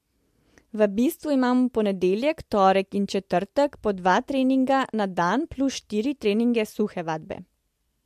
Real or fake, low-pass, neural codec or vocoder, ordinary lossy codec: real; 14.4 kHz; none; MP3, 64 kbps